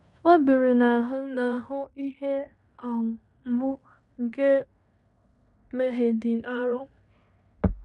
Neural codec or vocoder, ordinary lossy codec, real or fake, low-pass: codec, 16 kHz in and 24 kHz out, 0.9 kbps, LongCat-Audio-Codec, fine tuned four codebook decoder; none; fake; 10.8 kHz